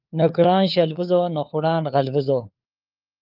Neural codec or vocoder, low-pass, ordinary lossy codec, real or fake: codec, 16 kHz, 4 kbps, FunCodec, trained on LibriTTS, 50 frames a second; 5.4 kHz; Opus, 24 kbps; fake